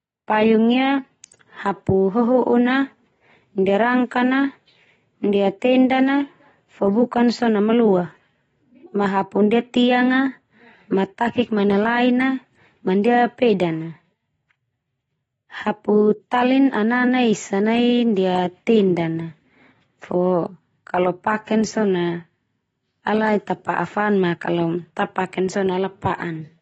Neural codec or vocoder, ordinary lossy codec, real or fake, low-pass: none; AAC, 24 kbps; real; 19.8 kHz